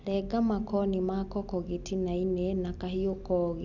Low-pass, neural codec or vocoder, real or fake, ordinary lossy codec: 7.2 kHz; none; real; none